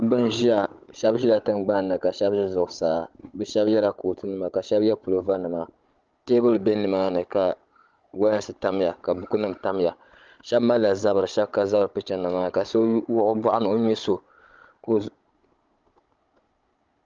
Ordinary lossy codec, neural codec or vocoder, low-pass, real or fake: Opus, 24 kbps; codec, 16 kHz, 16 kbps, FunCodec, trained on Chinese and English, 50 frames a second; 7.2 kHz; fake